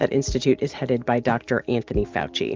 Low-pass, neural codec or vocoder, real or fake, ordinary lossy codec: 7.2 kHz; none; real; Opus, 24 kbps